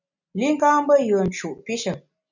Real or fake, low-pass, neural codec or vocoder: real; 7.2 kHz; none